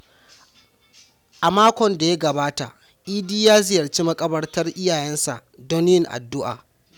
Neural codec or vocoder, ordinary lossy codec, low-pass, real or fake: none; none; 19.8 kHz; real